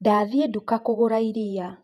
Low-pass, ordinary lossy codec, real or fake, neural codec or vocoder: 14.4 kHz; none; fake; vocoder, 48 kHz, 128 mel bands, Vocos